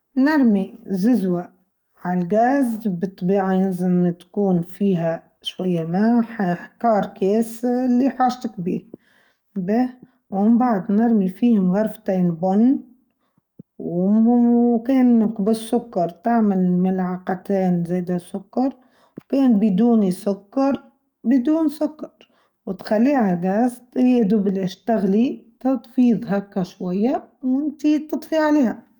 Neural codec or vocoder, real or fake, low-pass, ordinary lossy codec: codec, 44.1 kHz, 7.8 kbps, DAC; fake; 19.8 kHz; none